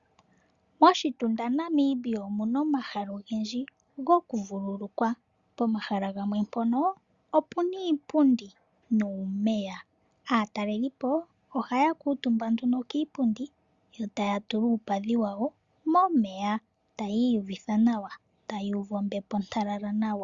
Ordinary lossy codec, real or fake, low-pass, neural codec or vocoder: Opus, 64 kbps; real; 7.2 kHz; none